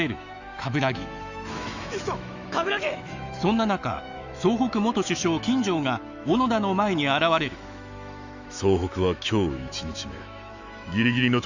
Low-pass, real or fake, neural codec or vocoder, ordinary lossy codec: 7.2 kHz; fake; autoencoder, 48 kHz, 128 numbers a frame, DAC-VAE, trained on Japanese speech; Opus, 64 kbps